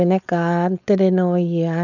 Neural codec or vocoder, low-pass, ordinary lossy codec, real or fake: codec, 16 kHz, 4.8 kbps, FACodec; 7.2 kHz; none; fake